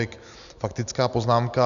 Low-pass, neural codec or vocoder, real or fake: 7.2 kHz; none; real